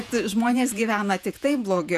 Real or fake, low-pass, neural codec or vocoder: fake; 14.4 kHz; vocoder, 48 kHz, 128 mel bands, Vocos